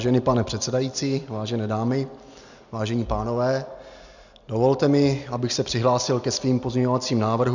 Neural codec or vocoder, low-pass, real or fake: none; 7.2 kHz; real